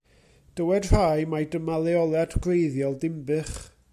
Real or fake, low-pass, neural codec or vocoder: real; 14.4 kHz; none